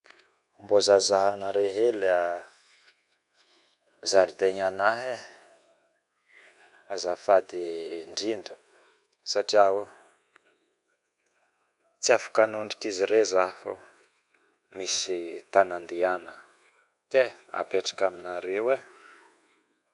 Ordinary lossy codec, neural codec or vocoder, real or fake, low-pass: none; codec, 24 kHz, 1.2 kbps, DualCodec; fake; 10.8 kHz